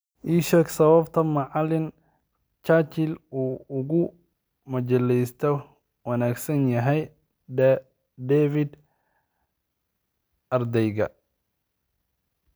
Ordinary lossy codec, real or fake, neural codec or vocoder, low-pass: none; real; none; none